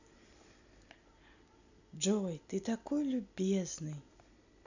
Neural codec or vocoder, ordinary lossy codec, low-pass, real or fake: none; none; 7.2 kHz; real